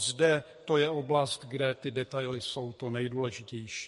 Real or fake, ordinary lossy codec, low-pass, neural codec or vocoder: fake; MP3, 48 kbps; 14.4 kHz; codec, 44.1 kHz, 2.6 kbps, SNAC